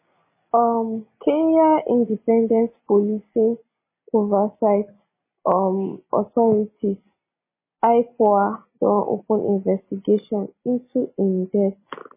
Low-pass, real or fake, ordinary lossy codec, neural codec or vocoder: 3.6 kHz; real; MP3, 16 kbps; none